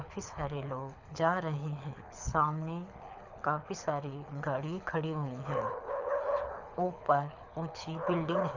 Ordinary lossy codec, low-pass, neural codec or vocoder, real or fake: none; 7.2 kHz; codec, 24 kHz, 6 kbps, HILCodec; fake